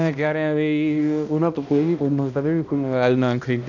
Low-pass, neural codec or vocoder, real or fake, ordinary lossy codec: 7.2 kHz; codec, 16 kHz, 1 kbps, X-Codec, HuBERT features, trained on balanced general audio; fake; none